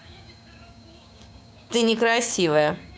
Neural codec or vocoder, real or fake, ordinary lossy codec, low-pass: codec, 16 kHz, 6 kbps, DAC; fake; none; none